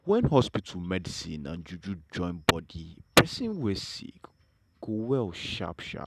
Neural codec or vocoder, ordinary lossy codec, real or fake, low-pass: none; none; real; 14.4 kHz